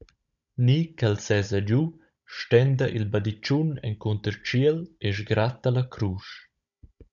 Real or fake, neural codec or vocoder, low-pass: fake; codec, 16 kHz, 16 kbps, FunCodec, trained on Chinese and English, 50 frames a second; 7.2 kHz